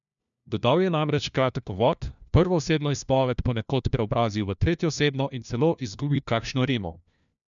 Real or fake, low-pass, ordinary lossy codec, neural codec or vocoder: fake; 7.2 kHz; MP3, 96 kbps; codec, 16 kHz, 1 kbps, FunCodec, trained on LibriTTS, 50 frames a second